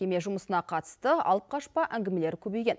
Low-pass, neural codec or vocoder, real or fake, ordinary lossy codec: none; none; real; none